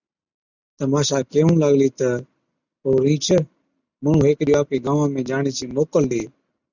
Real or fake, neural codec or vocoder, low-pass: real; none; 7.2 kHz